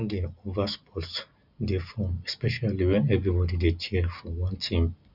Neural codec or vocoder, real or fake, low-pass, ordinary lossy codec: none; real; 5.4 kHz; none